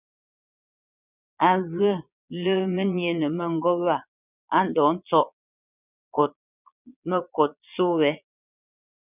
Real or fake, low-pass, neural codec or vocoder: fake; 3.6 kHz; vocoder, 22.05 kHz, 80 mel bands, Vocos